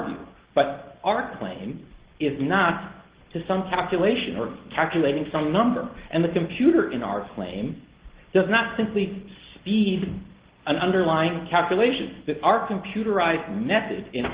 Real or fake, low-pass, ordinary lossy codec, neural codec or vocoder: real; 3.6 kHz; Opus, 16 kbps; none